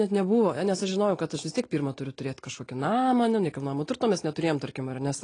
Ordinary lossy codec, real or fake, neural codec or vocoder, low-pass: AAC, 32 kbps; real; none; 9.9 kHz